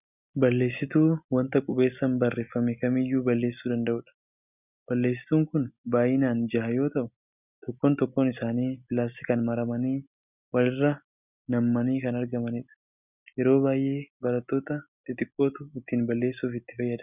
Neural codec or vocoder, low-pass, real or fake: none; 3.6 kHz; real